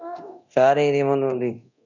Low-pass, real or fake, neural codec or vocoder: 7.2 kHz; fake; codec, 24 kHz, 0.9 kbps, DualCodec